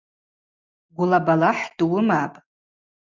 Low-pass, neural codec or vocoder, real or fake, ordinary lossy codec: 7.2 kHz; none; real; Opus, 64 kbps